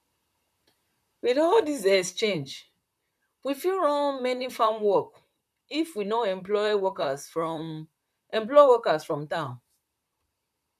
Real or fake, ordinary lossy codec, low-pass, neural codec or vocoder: fake; none; 14.4 kHz; vocoder, 44.1 kHz, 128 mel bands, Pupu-Vocoder